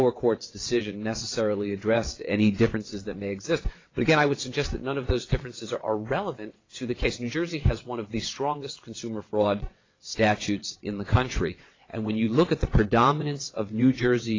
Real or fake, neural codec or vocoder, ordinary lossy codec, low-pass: fake; vocoder, 22.05 kHz, 80 mel bands, WaveNeXt; AAC, 32 kbps; 7.2 kHz